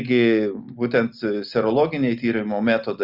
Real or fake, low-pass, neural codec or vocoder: real; 5.4 kHz; none